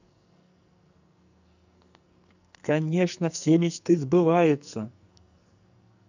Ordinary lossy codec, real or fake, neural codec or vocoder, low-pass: none; fake; codec, 44.1 kHz, 2.6 kbps, SNAC; 7.2 kHz